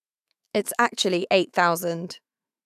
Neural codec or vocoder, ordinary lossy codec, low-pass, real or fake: autoencoder, 48 kHz, 128 numbers a frame, DAC-VAE, trained on Japanese speech; none; 14.4 kHz; fake